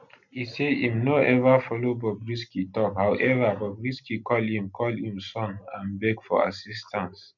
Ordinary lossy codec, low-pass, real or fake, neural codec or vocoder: none; 7.2 kHz; real; none